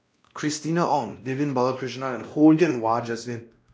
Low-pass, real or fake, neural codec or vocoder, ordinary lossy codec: none; fake; codec, 16 kHz, 1 kbps, X-Codec, WavLM features, trained on Multilingual LibriSpeech; none